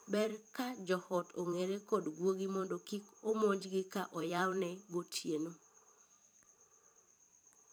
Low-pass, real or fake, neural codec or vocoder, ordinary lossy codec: none; fake; vocoder, 44.1 kHz, 128 mel bands every 512 samples, BigVGAN v2; none